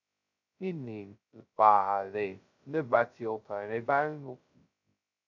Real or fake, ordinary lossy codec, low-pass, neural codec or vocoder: fake; none; 7.2 kHz; codec, 16 kHz, 0.2 kbps, FocalCodec